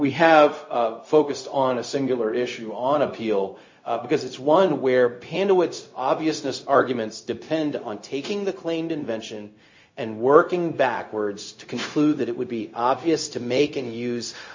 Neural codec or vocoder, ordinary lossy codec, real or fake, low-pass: codec, 16 kHz, 0.4 kbps, LongCat-Audio-Codec; MP3, 32 kbps; fake; 7.2 kHz